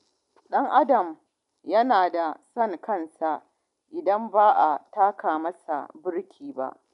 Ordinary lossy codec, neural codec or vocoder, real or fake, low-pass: none; none; real; 10.8 kHz